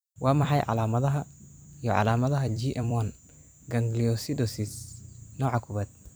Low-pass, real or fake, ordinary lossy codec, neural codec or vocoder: none; fake; none; vocoder, 44.1 kHz, 128 mel bands every 256 samples, BigVGAN v2